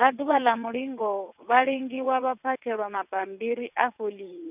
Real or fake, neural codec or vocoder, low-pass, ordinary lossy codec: fake; vocoder, 22.05 kHz, 80 mel bands, WaveNeXt; 3.6 kHz; none